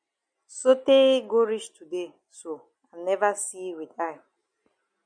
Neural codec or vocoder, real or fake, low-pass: none; real; 9.9 kHz